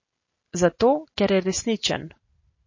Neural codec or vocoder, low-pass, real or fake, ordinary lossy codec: none; 7.2 kHz; real; MP3, 32 kbps